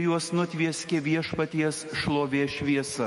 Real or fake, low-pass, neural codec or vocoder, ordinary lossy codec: fake; 19.8 kHz; autoencoder, 48 kHz, 128 numbers a frame, DAC-VAE, trained on Japanese speech; MP3, 48 kbps